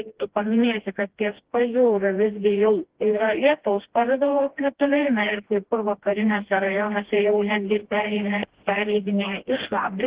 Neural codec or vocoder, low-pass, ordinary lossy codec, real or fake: codec, 16 kHz, 1 kbps, FreqCodec, smaller model; 3.6 kHz; Opus, 16 kbps; fake